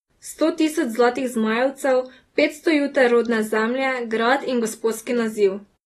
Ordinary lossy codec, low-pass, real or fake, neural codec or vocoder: AAC, 32 kbps; 19.8 kHz; real; none